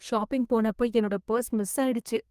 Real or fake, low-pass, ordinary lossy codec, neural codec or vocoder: fake; 14.4 kHz; Opus, 32 kbps; codec, 32 kHz, 1.9 kbps, SNAC